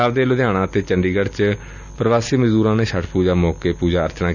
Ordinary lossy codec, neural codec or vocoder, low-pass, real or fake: none; none; 7.2 kHz; real